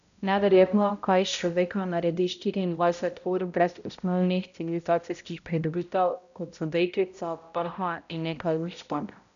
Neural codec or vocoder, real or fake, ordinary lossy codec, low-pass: codec, 16 kHz, 0.5 kbps, X-Codec, HuBERT features, trained on balanced general audio; fake; none; 7.2 kHz